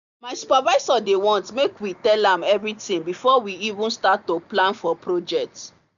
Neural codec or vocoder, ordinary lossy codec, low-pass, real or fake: none; none; 7.2 kHz; real